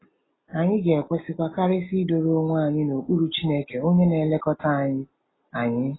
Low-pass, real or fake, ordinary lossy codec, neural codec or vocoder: 7.2 kHz; real; AAC, 16 kbps; none